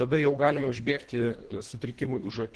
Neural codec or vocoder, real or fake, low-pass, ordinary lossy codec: codec, 24 kHz, 1.5 kbps, HILCodec; fake; 10.8 kHz; Opus, 16 kbps